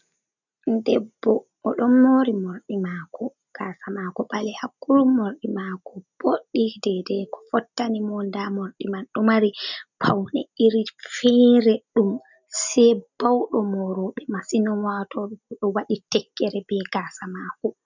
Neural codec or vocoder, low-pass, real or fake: none; 7.2 kHz; real